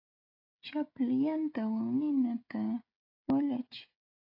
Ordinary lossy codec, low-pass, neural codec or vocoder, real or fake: AAC, 32 kbps; 5.4 kHz; codec, 16 kHz, 16 kbps, FreqCodec, smaller model; fake